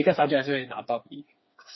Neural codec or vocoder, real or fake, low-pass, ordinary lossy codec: codec, 16 kHz, 4 kbps, FreqCodec, smaller model; fake; 7.2 kHz; MP3, 24 kbps